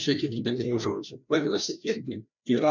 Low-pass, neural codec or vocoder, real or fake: 7.2 kHz; codec, 16 kHz, 1 kbps, FreqCodec, larger model; fake